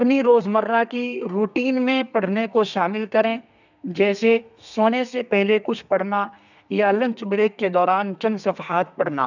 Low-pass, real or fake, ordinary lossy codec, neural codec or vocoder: 7.2 kHz; fake; none; codec, 32 kHz, 1.9 kbps, SNAC